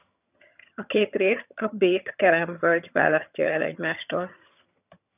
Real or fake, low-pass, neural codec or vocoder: fake; 3.6 kHz; vocoder, 22.05 kHz, 80 mel bands, HiFi-GAN